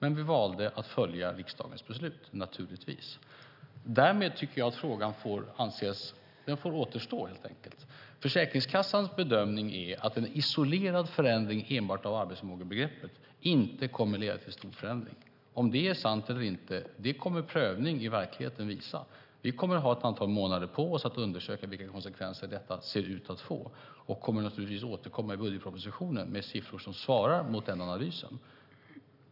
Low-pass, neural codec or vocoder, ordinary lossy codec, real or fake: 5.4 kHz; none; none; real